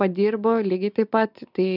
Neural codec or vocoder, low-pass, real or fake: none; 5.4 kHz; real